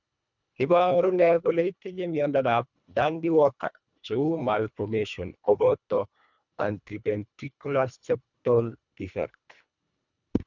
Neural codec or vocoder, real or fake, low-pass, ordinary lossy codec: codec, 24 kHz, 1.5 kbps, HILCodec; fake; 7.2 kHz; none